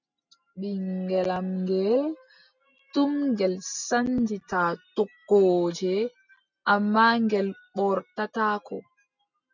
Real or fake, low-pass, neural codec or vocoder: real; 7.2 kHz; none